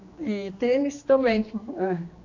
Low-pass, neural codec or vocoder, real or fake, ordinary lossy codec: 7.2 kHz; codec, 16 kHz, 2 kbps, X-Codec, HuBERT features, trained on general audio; fake; AAC, 48 kbps